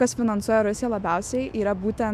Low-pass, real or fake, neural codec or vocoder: 14.4 kHz; fake; autoencoder, 48 kHz, 128 numbers a frame, DAC-VAE, trained on Japanese speech